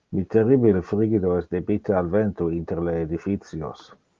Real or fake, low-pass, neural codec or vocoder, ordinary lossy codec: real; 7.2 kHz; none; Opus, 32 kbps